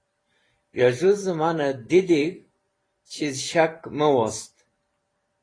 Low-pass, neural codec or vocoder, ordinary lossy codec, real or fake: 9.9 kHz; vocoder, 44.1 kHz, 128 mel bands every 512 samples, BigVGAN v2; AAC, 32 kbps; fake